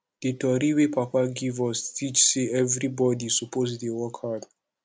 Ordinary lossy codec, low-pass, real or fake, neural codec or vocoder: none; none; real; none